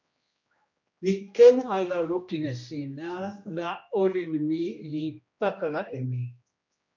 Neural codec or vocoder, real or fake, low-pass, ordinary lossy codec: codec, 16 kHz, 1 kbps, X-Codec, HuBERT features, trained on general audio; fake; 7.2 kHz; MP3, 48 kbps